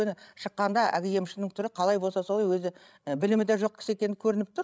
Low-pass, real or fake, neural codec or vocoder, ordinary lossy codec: none; fake; codec, 16 kHz, 16 kbps, FreqCodec, larger model; none